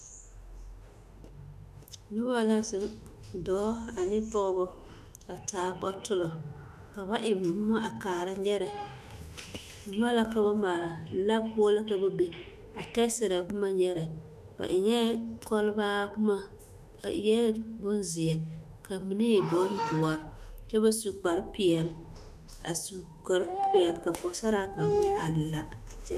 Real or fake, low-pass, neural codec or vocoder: fake; 14.4 kHz; autoencoder, 48 kHz, 32 numbers a frame, DAC-VAE, trained on Japanese speech